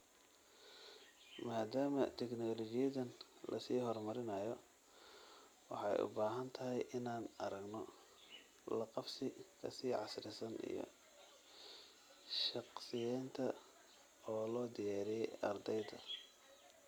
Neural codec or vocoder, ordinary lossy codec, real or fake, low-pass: none; none; real; none